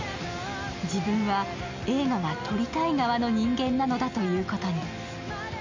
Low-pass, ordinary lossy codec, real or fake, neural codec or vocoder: 7.2 kHz; MP3, 48 kbps; real; none